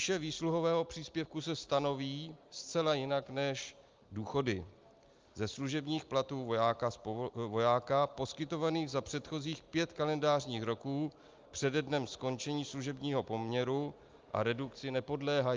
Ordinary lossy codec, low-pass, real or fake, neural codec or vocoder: Opus, 24 kbps; 7.2 kHz; real; none